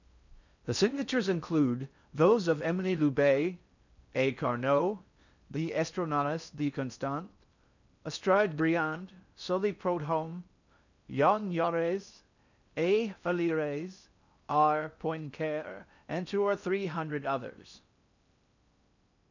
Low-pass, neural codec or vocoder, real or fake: 7.2 kHz; codec, 16 kHz in and 24 kHz out, 0.6 kbps, FocalCodec, streaming, 4096 codes; fake